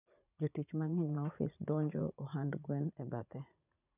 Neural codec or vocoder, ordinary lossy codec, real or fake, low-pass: vocoder, 44.1 kHz, 80 mel bands, Vocos; none; fake; 3.6 kHz